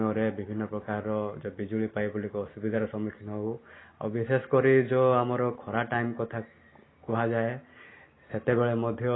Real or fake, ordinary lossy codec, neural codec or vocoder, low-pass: real; AAC, 16 kbps; none; 7.2 kHz